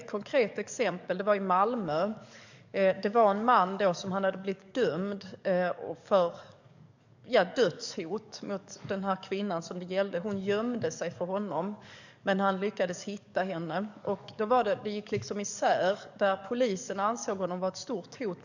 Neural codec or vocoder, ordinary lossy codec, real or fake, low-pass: codec, 44.1 kHz, 7.8 kbps, DAC; none; fake; 7.2 kHz